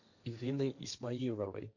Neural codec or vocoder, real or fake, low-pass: codec, 16 kHz, 1.1 kbps, Voila-Tokenizer; fake; 7.2 kHz